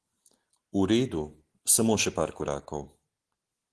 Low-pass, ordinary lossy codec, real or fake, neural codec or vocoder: 10.8 kHz; Opus, 16 kbps; real; none